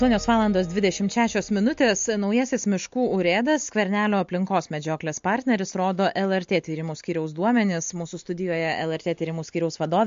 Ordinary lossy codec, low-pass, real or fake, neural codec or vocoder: MP3, 48 kbps; 7.2 kHz; real; none